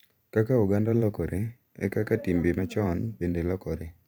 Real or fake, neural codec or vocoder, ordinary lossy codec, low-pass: fake; vocoder, 44.1 kHz, 128 mel bands every 512 samples, BigVGAN v2; none; none